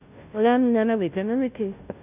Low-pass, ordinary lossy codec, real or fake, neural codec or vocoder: 3.6 kHz; none; fake; codec, 16 kHz, 0.5 kbps, FunCodec, trained on Chinese and English, 25 frames a second